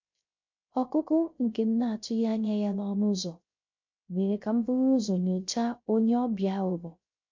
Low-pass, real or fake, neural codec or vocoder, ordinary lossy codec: 7.2 kHz; fake; codec, 16 kHz, 0.3 kbps, FocalCodec; MP3, 48 kbps